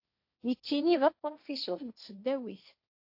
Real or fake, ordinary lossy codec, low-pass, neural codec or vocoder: fake; AAC, 48 kbps; 5.4 kHz; codec, 16 kHz, 1.1 kbps, Voila-Tokenizer